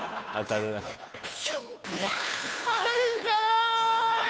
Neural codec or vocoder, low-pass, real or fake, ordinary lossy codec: codec, 16 kHz, 2 kbps, FunCodec, trained on Chinese and English, 25 frames a second; none; fake; none